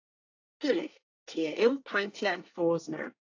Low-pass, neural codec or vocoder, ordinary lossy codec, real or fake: 7.2 kHz; codec, 24 kHz, 1 kbps, SNAC; AAC, 48 kbps; fake